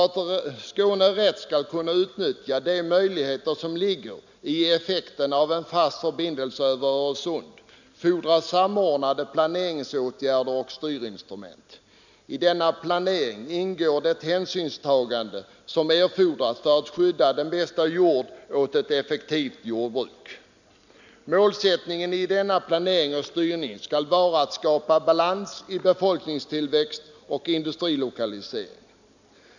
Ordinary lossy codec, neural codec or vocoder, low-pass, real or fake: none; none; 7.2 kHz; real